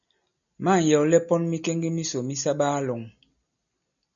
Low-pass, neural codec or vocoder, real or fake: 7.2 kHz; none; real